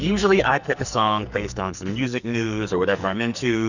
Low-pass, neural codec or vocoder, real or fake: 7.2 kHz; codec, 44.1 kHz, 2.6 kbps, SNAC; fake